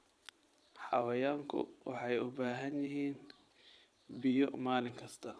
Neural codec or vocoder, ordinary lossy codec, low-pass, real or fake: vocoder, 22.05 kHz, 80 mel bands, Vocos; none; none; fake